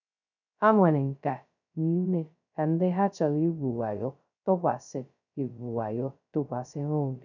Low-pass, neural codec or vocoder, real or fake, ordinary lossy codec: 7.2 kHz; codec, 16 kHz, 0.2 kbps, FocalCodec; fake; none